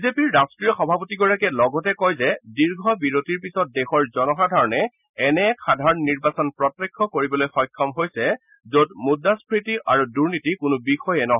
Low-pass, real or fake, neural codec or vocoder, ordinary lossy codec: 3.6 kHz; real; none; none